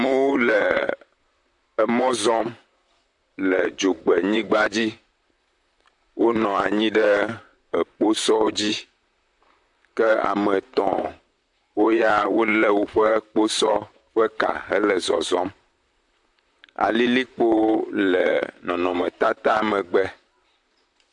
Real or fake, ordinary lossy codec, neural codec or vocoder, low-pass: fake; AAC, 64 kbps; vocoder, 44.1 kHz, 128 mel bands, Pupu-Vocoder; 10.8 kHz